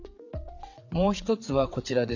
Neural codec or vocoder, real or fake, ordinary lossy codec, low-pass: vocoder, 22.05 kHz, 80 mel bands, WaveNeXt; fake; none; 7.2 kHz